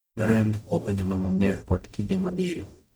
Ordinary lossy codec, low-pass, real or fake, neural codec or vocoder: none; none; fake; codec, 44.1 kHz, 0.9 kbps, DAC